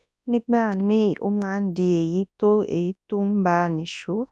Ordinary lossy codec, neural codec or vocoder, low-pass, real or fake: none; codec, 24 kHz, 0.9 kbps, WavTokenizer, large speech release; none; fake